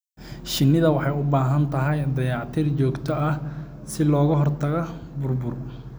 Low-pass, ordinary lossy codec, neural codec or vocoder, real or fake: none; none; none; real